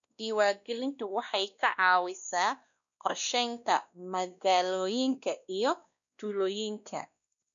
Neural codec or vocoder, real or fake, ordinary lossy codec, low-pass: codec, 16 kHz, 1 kbps, X-Codec, WavLM features, trained on Multilingual LibriSpeech; fake; none; 7.2 kHz